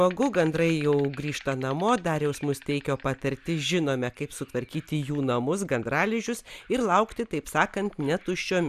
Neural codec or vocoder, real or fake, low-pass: none; real; 14.4 kHz